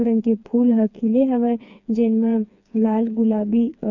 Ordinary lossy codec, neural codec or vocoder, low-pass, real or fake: none; codec, 16 kHz, 4 kbps, FreqCodec, smaller model; 7.2 kHz; fake